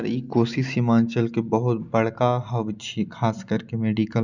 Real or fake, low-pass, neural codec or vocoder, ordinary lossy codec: real; 7.2 kHz; none; none